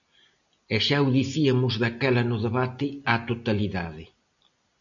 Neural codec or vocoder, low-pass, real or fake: none; 7.2 kHz; real